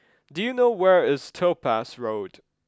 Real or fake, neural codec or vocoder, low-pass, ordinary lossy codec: real; none; none; none